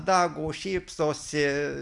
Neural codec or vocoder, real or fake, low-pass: none; real; 10.8 kHz